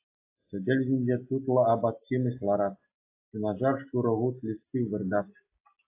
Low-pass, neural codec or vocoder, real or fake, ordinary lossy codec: 3.6 kHz; none; real; AAC, 24 kbps